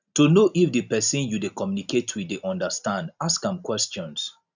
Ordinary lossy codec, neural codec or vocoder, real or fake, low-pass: none; none; real; 7.2 kHz